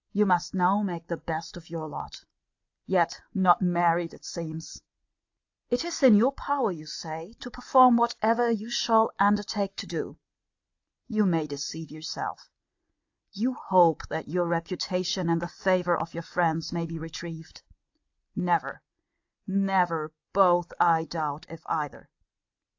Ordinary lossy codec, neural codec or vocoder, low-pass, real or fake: AAC, 48 kbps; none; 7.2 kHz; real